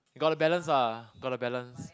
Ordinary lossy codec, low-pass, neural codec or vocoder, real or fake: none; none; none; real